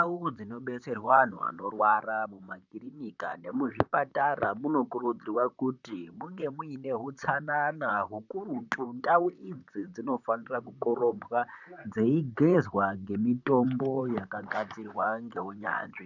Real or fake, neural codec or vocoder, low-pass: fake; vocoder, 44.1 kHz, 128 mel bands, Pupu-Vocoder; 7.2 kHz